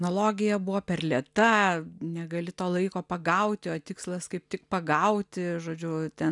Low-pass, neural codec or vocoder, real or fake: 10.8 kHz; none; real